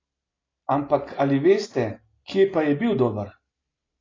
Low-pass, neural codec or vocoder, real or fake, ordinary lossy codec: 7.2 kHz; none; real; AAC, 32 kbps